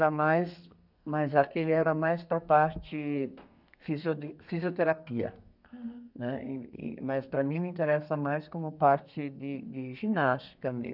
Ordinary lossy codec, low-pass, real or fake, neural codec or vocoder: none; 5.4 kHz; fake; codec, 32 kHz, 1.9 kbps, SNAC